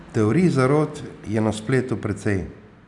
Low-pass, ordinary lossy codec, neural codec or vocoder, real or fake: 10.8 kHz; none; none; real